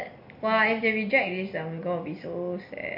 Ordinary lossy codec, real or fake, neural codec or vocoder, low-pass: MP3, 32 kbps; real; none; 5.4 kHz